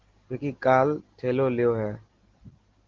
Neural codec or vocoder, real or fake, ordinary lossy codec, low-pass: none; real; Opus, 16 kbps; 7.2 kHz